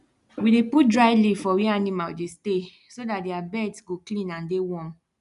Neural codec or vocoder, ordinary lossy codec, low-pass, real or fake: none; none; 10.8 kHz; real